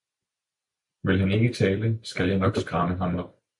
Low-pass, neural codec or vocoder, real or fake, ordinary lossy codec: 10.8 kHz; none; real; MP3, 64 kbps